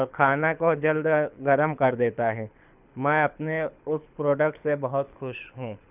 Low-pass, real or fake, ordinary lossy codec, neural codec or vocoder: 3.6 kHz; fake; none; codec, 24 kHz, 6 kbps, HILCodec